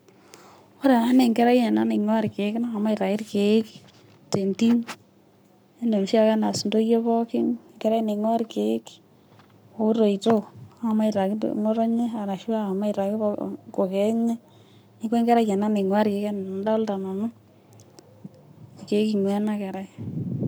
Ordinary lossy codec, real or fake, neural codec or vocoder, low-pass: none; fake; codec, 44.1 kHz, 7.8 kbps, Pupu-Codec; none